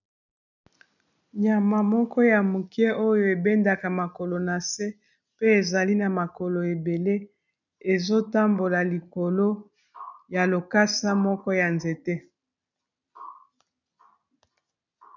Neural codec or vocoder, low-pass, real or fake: none; 7.2 kHz; real